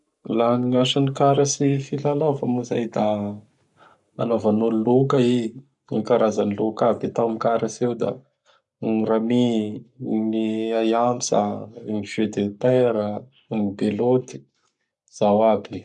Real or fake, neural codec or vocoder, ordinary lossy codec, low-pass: fake; codec, 44.1 kHz, 7.8 kbps, Pupu-Codec; none; 10.8 kHz